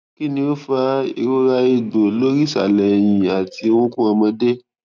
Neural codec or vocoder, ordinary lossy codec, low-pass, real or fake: none; none; none; real